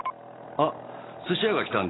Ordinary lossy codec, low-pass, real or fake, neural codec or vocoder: AAC, 16 kbps; 7.2 kHz; real; none